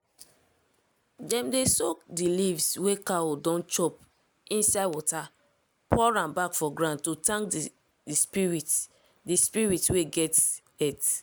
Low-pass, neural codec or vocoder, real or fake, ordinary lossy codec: none; none; real; none